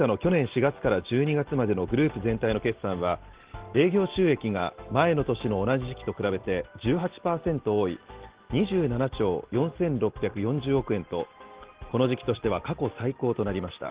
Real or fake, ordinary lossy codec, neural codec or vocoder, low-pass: real; Opus, 16 kbps; none; 3.6 kHz